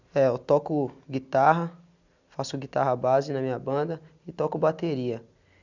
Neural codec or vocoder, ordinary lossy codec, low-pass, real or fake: none; none; 7.2 kHz; real